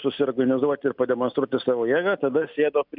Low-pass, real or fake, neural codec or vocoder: 5.4 kHz; real; none